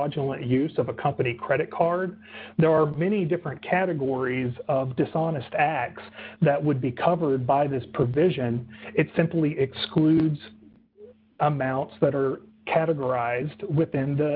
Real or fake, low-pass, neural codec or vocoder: real; 5.4 kHz; none